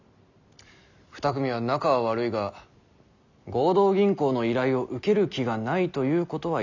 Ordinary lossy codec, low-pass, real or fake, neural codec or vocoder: none; 7.2 kHz; real; none